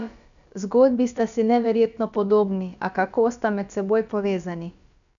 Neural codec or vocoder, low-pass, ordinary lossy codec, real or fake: codec, 16 kHz, about 1 kbps, DyCAST, with the encoder's durations; 7.2 kHz; none; fake